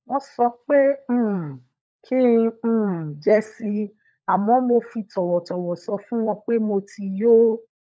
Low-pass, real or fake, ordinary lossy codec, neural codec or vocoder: none; fake; none; codec, 16 kHz, 16 kbps, FunCodec, trained on LibriTTS, 50 frames a second